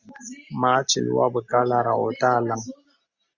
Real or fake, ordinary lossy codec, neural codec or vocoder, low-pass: real; Opus, 64 kbps; none; 7.2 kHz